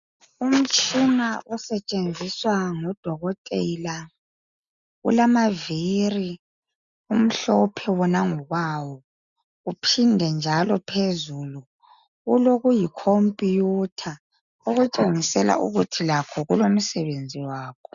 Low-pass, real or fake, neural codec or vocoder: 7.2 kHz; real; none